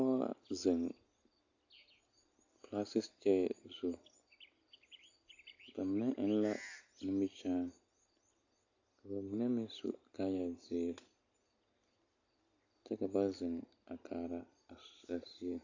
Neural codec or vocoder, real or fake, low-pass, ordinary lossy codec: none; real; 7.2 kHz; AAC, 48 kbps